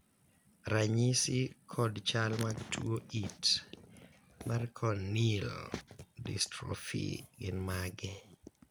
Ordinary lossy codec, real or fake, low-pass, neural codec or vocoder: none; real; none; none